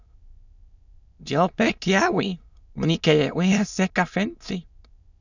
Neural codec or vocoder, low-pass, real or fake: autoencoder, 22.05 kHz, a latent of 192 numbers a frame, VITS, trained on many speakers; 7.2 kHz; fake